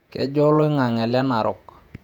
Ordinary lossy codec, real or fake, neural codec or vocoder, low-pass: none; fake; vocoder, 48 kHz, 128 mel bands, Vocos; 19.8 kHz